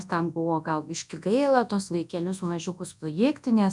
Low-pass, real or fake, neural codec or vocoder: 10.8 kHz; fake; codec, 24 kHz, 0.9 kbps, WavTokenizer, large speech release